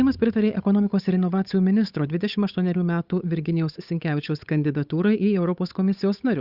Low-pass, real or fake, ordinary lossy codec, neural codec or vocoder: 5.4 kHz; fake; Opus, 64 kbps; codec, 16 kHz, 8 kbps, FunCodec, trained on Chinese and English, 25 frames a second